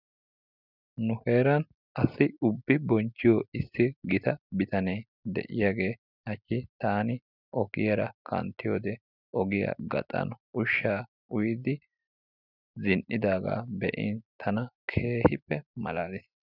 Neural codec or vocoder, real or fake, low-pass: none; real; 5.4 kHz